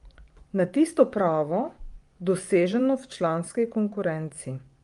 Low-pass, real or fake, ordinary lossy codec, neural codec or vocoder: 10.8 kHz; fake; Opus, 32 kbps; vocoder, 24 kHz, 100 mel bands, Vocos